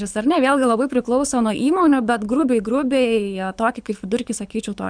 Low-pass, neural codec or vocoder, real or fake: 9.9 kHz; codec, 24 kHz, 6 kbps, HILCodec; fake